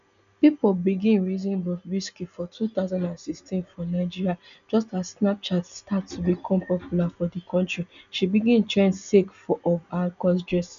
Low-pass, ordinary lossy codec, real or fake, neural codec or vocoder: 7.2 kHz; none; real; none